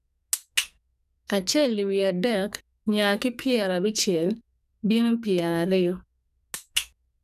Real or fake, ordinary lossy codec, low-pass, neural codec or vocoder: fake; none; 14.4 kHz; codec, 44.1 kHz, 2.6 kbps, SNAC